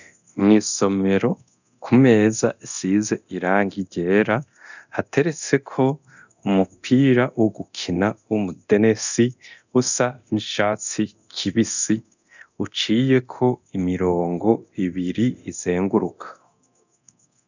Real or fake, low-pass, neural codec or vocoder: fake; 7.2 kHz; codec, 24 kHz, 0.9 kbps, DualCodec